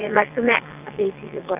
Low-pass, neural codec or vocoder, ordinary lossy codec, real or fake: 3.6 kHz; vocoder, 22.05 kHz, 80 mel bands, WaveNeXt; none; fake